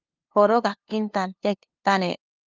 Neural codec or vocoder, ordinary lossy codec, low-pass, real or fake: codec, 16 kHz, 2 kbps, FunCodec, trained on LibriTTS, 25 frames a second; Opus, 24 kbps; 7.2 kHz; fake